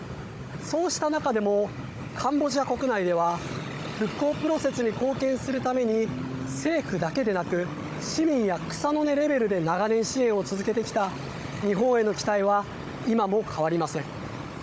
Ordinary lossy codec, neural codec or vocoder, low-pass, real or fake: none; codec, 16 kHz, 16 kbps, FunCodec, trained on Chinese and English, 50 frames a second; none; fake